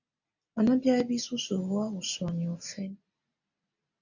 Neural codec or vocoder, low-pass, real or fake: none; 7.2 kHz; real